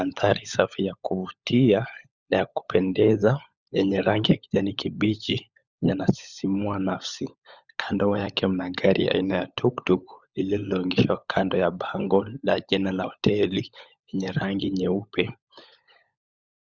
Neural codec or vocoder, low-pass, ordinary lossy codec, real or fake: codec, 16 kHz, 16 kbps, FunCodec, trained on LibriTTS, 50 frames a second; 7.2 kHz; Opus, 64 kbps; fake